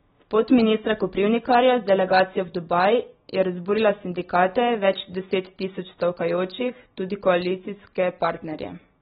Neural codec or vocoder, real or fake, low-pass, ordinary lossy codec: none; real; 9.9 kHz; AAC, 16 kbps